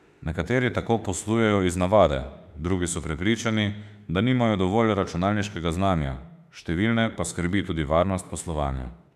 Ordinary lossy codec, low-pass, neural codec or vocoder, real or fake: none; 14.4 kHz; autoencoder, 48 kHz, 32 numbers a frame, DAC-VAE, trained on Japanese speech; fake